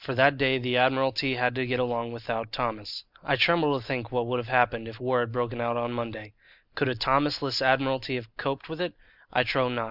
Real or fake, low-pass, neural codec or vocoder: real; 5.4 kHz; none